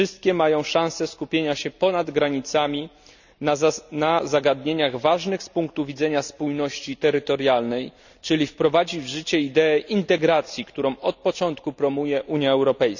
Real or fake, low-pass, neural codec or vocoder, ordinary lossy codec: real; 7.2 kHz; none; none